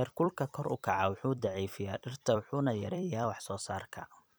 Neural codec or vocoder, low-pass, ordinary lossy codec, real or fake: vocoder, 44.1 kHz, 128 mel bands every 256 samples, BigVGAN v2; none; none; fake